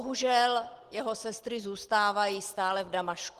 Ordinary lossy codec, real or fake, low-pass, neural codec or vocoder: Opus, 16 kbps; real; 14.4 kHz; none